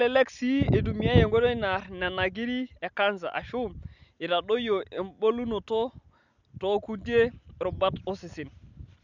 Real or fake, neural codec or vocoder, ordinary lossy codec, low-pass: real; none; none; 7.2 kHz